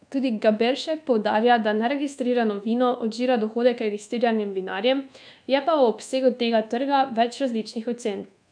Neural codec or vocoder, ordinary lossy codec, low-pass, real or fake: codec, 24 kHz, 1.2 kbps, DualCodec; none; 9.9 kHz; fake